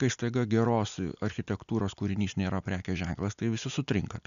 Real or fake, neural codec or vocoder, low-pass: real; none; 7.2 kHz